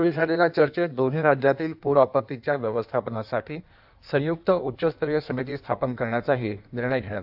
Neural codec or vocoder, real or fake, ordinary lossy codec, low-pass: codec, 16 kHz in and 24 kHz out, 1.1 kbps, FireRedTTS-2 codec; fake; AAC, 48 kbps; 5.4 kHz